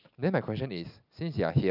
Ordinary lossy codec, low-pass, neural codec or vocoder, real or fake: none; 5.4 kHz; none; real